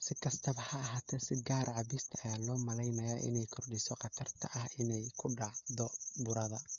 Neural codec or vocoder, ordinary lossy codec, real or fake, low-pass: codec, 16 kHz, 16 kbps, FreqCodec, smaller model; none; fake; 7.2 kHz